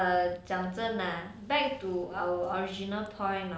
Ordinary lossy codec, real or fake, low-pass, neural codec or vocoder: none; real; none; none